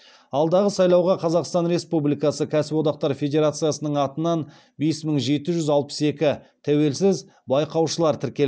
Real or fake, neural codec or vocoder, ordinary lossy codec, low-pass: real; none; none; none